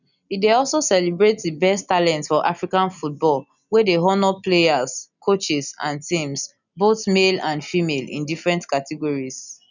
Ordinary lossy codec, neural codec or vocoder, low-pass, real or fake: none; none; 7.2 kHz; real